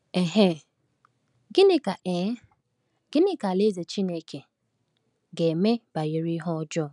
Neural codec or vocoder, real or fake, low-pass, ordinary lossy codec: none; real; 10.8 kHz; none